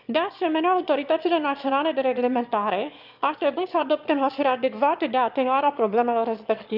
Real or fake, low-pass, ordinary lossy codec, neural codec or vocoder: fake; 5.4 kHz; none; autoencoder, 22.05 kHz, a latent of 192 numbers a frame, VITS, trained on one speaker